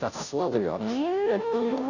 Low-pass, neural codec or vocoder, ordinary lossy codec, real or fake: 7.2 kHz; codec, 16 kHz, 0.5 kbps, FunCodec, trained on Chinese and English, 25 frames a second; none; fake